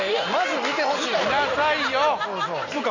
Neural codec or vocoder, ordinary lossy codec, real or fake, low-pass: none; AAC, 48 kbps; real; 7.2 kHz